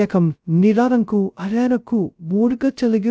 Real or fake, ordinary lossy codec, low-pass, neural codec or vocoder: fake; none; none; codec, 16 kHz, 0.2 kbps, FocalCodec